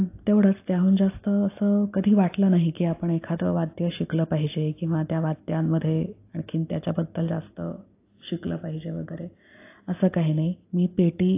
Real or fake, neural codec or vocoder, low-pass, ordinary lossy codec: real; none; 3.6 kHz; AAC, 24 kbps